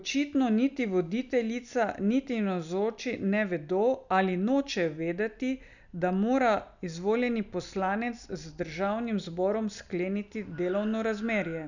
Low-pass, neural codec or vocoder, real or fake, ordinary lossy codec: 7.2 kHz; none; real; none